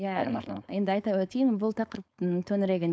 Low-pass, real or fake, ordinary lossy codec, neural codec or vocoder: none; fake; none; codec, 16 kHz, 4.8 kbps, FACodec